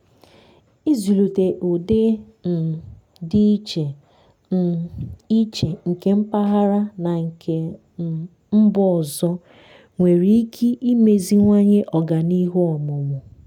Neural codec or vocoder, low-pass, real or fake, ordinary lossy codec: none; 19.8 kHz; real; none